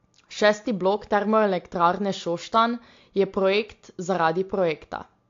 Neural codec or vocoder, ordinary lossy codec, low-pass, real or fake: none; AAC, 48 kbps; 7.2 kHz; real